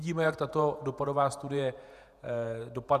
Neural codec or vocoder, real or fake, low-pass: vocoder, 48 kHz, 128 mel bands, Vocos; fake; 14.4 kHz